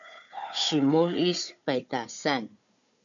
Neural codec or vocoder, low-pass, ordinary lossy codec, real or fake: codec, 16 kHz, 4 kbps, FunCodec, trained on Chinese and English, 50 frames a second; 7.2 kHz; MP3, 96 kbps; fake